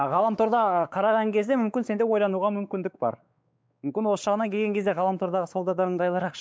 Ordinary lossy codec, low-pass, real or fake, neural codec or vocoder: none; none; fake; codec, 16 kHz, 4 kbps, X-Codec, WavLM features, trained on Multilingual LibriSpeech